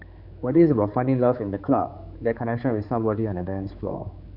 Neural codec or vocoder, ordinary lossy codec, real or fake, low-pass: codec, 16 kHz, 4 kbps, X-Codec, HuBERT features, trained on general audio; none; fake; 5.4 kHz